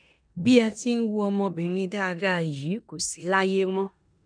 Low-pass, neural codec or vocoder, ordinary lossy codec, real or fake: 9.9 kHz; codec, 16 kHz in and 24 kHz out, 0.9 kbps, LongCat-Audio-Codec, four codebook decoder; none; fake